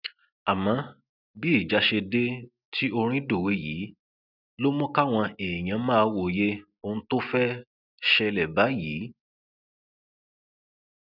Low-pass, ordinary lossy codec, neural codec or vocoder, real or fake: 5.4 kHz; none; none; real